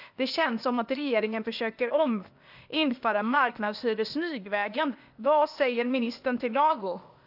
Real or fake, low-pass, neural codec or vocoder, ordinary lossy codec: fake; 5.4 kHz; codec, 16 kHz, 0.8 kbps, ZipCodec; AAC, 48 kbps